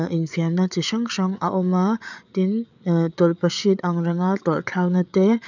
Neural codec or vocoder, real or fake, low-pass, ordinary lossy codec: codec, 16 kHz, 8 kbps, FreqCodec, larger model; fake; 7.2 kHz; none